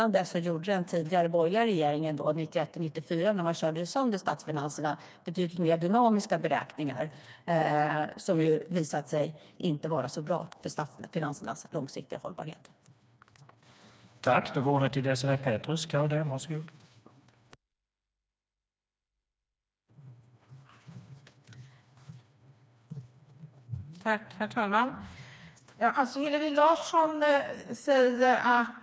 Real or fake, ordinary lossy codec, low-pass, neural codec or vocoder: fake; none; none; codec, 16 kHz, 2 kbps, FreqCodec, smaller model